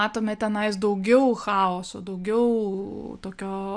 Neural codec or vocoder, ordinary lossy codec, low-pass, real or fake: none; MP3, 96 kbps; 9.9 kHz; real